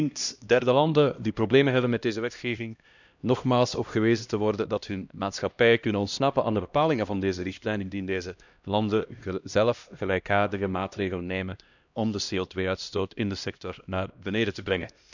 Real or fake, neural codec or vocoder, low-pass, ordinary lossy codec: fake; codec, 16 kHz, 1 kbps, X-Codec, HuBERT features, trained on LibriSpeech; 7.2 kHz; none